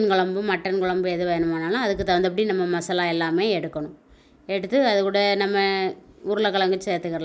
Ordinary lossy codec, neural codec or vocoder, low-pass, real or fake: none; none; none; real